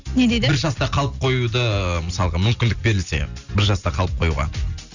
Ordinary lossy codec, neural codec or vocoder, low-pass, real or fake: none; none; 7.2 kHz; real